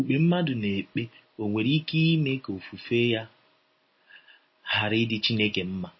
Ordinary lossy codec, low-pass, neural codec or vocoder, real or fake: MP3, 24 kbps; 7.2 kHz; none; real